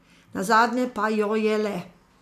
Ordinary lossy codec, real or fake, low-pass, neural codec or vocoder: AAC, 96 kbps; real; 14.4 kHz; none